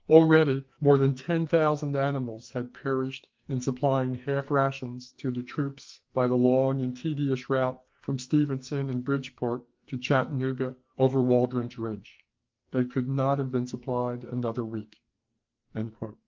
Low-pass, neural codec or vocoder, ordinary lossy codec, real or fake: 7.2 kHz; codec, 44.1 kHz, 2.6 kbps, SNAC; Opus, 24 kbps; fake